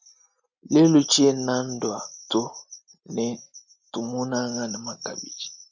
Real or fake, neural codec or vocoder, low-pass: real; none; 7.2 kHz